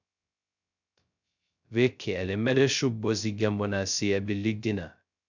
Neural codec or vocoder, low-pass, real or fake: codec, 16 kHz, 0.2 kbps, FocalCodec; 7.2 kHz; fake